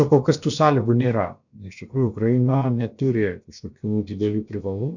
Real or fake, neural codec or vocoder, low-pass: fake; codec, 16 kHz, about 1 kbps, DyCAST, with the encoder's durations; 7.2 kHz